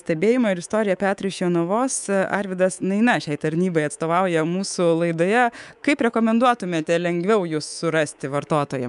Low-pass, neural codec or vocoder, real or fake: 10.8 kHz; codec, 24 kHz, 3.1 kbps, DualCodec; fake